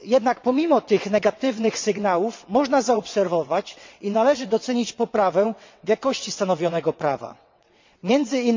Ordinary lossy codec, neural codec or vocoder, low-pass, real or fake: MP3, 64 kbps; vocoder, 22.05 kHz, 80 mel bands, WaveNeXt; 7.2 kHz; fake